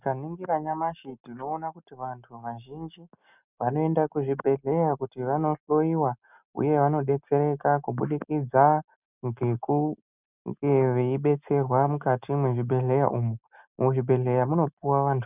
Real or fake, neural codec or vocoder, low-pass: real; none; 3.6 kHz